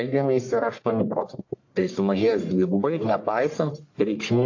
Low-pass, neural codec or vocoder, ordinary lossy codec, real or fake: 7.2 kHz; codec, 44.1 kHz, 1.7 kbps, Pupu-Codec; AAC, 48 kbps; fake